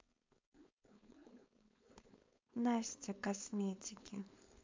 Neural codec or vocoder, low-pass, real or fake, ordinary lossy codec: codec, 16 kHz, 4.8 kbps, FACodec; 7.2 kHz; fake; MP3, 64 kbps